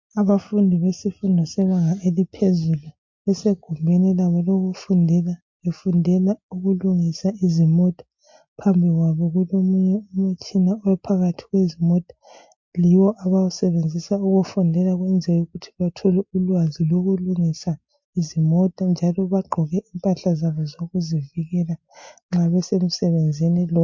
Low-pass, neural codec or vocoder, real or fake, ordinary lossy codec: 7.2 kHz; none; real; MP3, 48 kbps